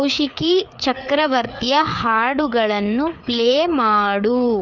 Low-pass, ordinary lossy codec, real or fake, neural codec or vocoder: 7.2 kHz; none; fake; codec, 16 kHz, 4 kbps, FreqCodec, larger model